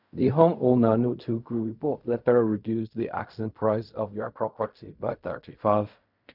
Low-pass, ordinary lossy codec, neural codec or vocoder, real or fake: 5.4 kHz; none; codec, 16 kHz in and 24 kHz out, 0.4 kbps, LongCat-Audio-Codec, fine tuned four codebook decoder; fake